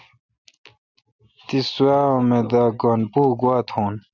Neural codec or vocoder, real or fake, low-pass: none; real; 7.2 kHz